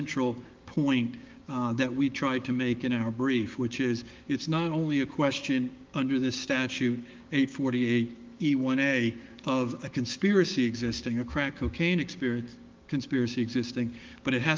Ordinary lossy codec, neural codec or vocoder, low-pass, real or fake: Opus, 32 kbps; codec, 16 kHz, 6 kbps, DAC; 7.2 kHz; fake